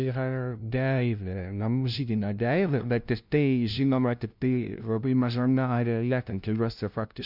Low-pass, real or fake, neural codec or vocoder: 5.4 kHz; fake; codec, 16 kHz, 0.5 kbps, FunCodec, trained on LibriTTS, 25 frames a second